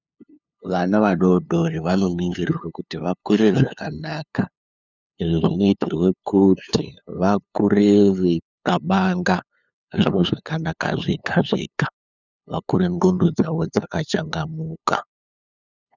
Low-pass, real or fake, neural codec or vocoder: 7.2 kHz; fake; codec, 16 kHz, 2 kbps, FunCodec, trained on LibriTTS, 25 frames a second